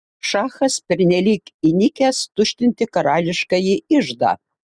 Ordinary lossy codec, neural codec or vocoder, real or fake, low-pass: Opus, 64 kbps; none; real; 9.9 kHz